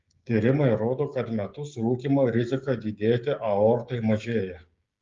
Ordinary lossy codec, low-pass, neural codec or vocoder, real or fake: Opus, 32 kbps; 7.2 kHz; codec, 16 kHz, 16 kbps, FreqCodec, smaller model; fake